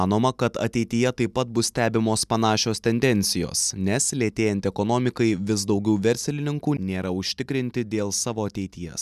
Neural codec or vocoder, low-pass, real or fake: none; 14.4 kHz; real